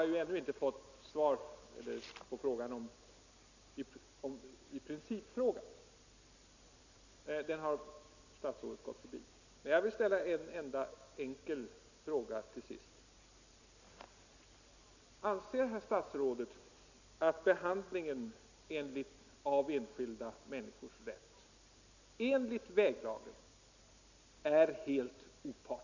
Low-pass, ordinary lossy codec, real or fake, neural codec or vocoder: 7.2 kHz; none; real; none